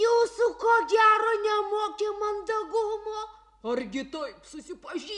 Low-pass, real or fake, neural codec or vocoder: 10.8 kHz; real; none